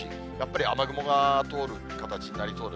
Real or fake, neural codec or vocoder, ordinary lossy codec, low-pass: real; none; none; none